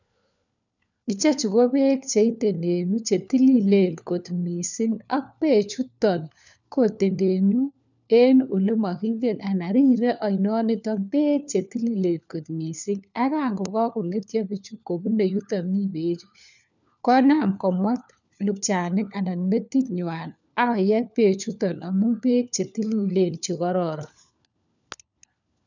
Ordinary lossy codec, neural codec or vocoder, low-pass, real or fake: none; codec, 16 kHz, 4 kbps, FunCodec, trained on LibriTTS, 50 frames a second; 7.2 kHz; fake